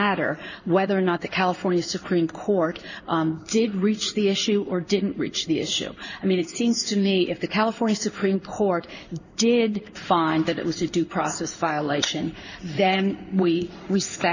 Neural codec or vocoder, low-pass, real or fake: codec, 16 kHz in and 24 kHz out, 1 kbps, XY-Tokenizer; 7.2 kHz; fake